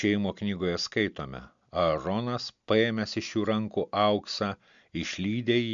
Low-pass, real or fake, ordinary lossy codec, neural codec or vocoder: 7.2 kHz; real; MP3, 64 kbps; none